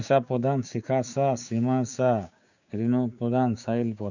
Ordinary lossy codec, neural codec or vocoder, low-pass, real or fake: none; codec, 16 kHz, 6 kbps, DAC; 7.2 kHz; fake